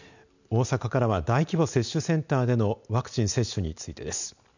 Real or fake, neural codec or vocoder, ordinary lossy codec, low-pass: real; none; none; 7.2 kHz